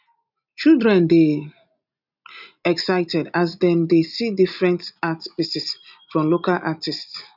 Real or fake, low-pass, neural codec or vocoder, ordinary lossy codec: real; 5.4 kHz; none; none